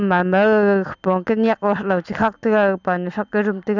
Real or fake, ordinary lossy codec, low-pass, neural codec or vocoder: real; none; 7.2 kHz; none